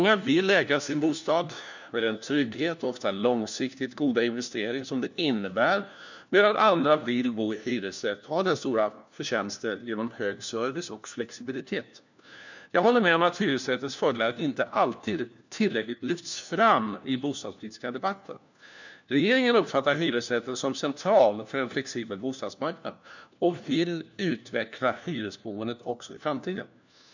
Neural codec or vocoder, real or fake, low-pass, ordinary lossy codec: codec, 16 kHz, 1 kbps, FunCodec, trained on LibriTTS, 50 frames a second; fake; 7.2 kHz; none